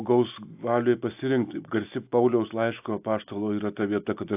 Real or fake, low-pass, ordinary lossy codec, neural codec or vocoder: real; 3.6 kHz; AAC, 32 kbps; none